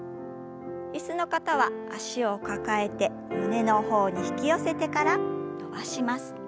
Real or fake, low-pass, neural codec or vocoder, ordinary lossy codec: real; none; none; none